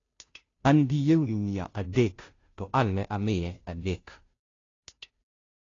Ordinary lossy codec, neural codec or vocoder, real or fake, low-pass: AAC, 32 kbps; codec, 16 kHz, 0.5 kbps, FunCodec, trained on Chinese and English, 25 frames a second; fake; 7.2 kHz